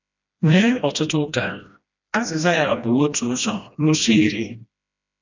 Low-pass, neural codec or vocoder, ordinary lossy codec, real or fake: 7.2 kHz; codec, 16 kHz, 1 kbps, FreqCodec, smaller model; none; fake